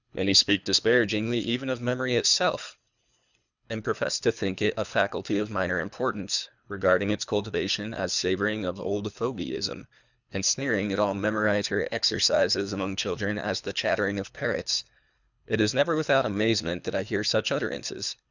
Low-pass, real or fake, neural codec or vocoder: 7.2 kHz; fake; codec, 24 kHz, 3 kbps, HILCodec